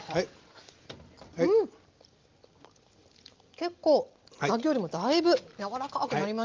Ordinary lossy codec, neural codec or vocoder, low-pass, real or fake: Opus, 32 kbps; none; 7.2 kHz; real